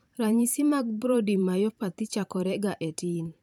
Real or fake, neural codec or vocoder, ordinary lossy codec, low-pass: fake; vocoder, 44.1 kHz, 128 mel bands every 512 samples, BigVGAN v2; none; 19.8 kHz